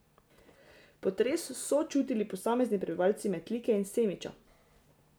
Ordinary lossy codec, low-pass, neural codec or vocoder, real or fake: none; none; none; real